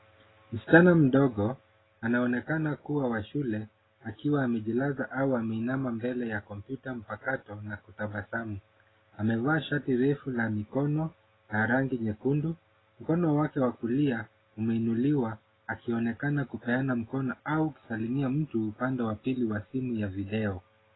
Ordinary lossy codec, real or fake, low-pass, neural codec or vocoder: AAC, 16 kbps; real; 7.2 kHz; none